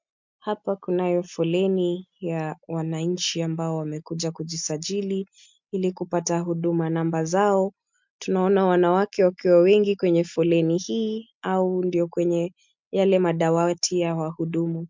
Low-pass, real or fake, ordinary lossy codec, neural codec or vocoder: 7.2 kHz; real; MP3, 64 kbps; none